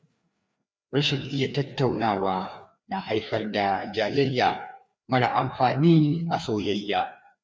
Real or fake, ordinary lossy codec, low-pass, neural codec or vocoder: fake; none; none; codec, 16 kHz, 2 kbps, FreqCodec, larger model